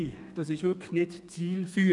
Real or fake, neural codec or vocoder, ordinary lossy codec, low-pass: fake; codec, 32 kHz, 1.9 kbps, SNAC; none; 10.8 kHz